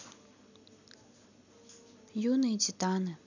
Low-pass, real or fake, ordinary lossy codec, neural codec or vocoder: 7.2 kHz; real; none; none